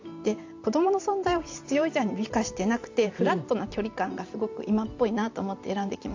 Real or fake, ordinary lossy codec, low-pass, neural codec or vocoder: fake; AAC, 48 kbps; 7.2 kHz; vocoder, 44.1 kHz, 128 mel bands every 512 samples, BigVGAN v2